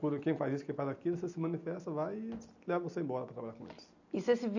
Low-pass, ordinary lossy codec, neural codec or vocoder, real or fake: 7.2 kHz; none; none; real